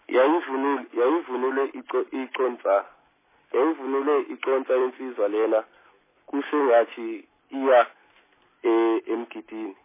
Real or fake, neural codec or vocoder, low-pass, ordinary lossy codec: real; none; 3.6 kHz; MP3, 16 kbps